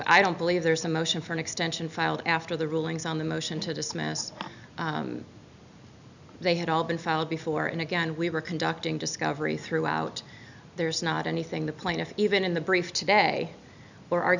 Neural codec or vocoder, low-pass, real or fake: none; 7.2 kHz; real